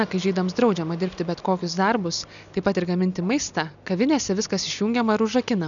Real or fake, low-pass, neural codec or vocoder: real; 7.2 kHz; none